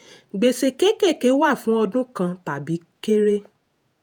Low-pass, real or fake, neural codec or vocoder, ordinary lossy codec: none; fake; vocoder, 48 kHz, 128 mel bands, Vocos; none